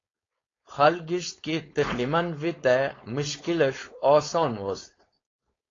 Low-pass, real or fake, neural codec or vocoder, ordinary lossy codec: 7.2 kHz; fake; codec, 16 kHz, 4.8 kbps, FACodec; AAC, 32 kbps